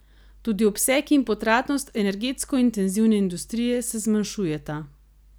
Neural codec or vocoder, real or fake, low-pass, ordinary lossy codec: none; real; none; none